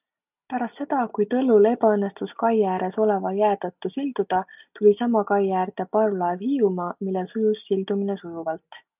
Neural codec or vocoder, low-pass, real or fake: none; 3.6 kHz; real